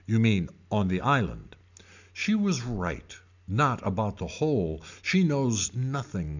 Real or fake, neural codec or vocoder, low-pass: real; none; 7.2 kHz